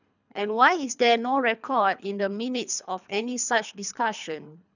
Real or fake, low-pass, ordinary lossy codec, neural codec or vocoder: fake; 7.2 kHz; none; codec, 24 kHz, 3 kbps, HILCodec